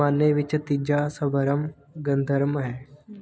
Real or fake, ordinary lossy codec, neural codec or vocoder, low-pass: real; none; none; none